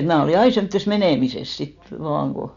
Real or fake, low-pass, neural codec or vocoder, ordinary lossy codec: real; 7.2 kHz; none; none